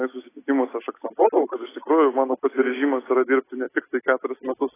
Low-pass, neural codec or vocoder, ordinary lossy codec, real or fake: 3.6 kHz; none; AAC, 16 kbps; real